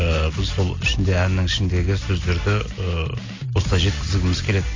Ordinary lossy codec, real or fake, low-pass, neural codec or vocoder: AAC, 32 kbps; real; 7.2 kHz; none